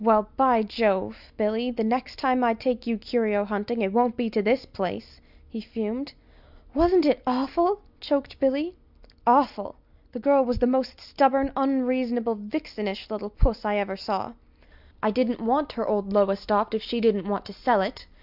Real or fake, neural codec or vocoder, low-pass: real; none; 5.4 kHz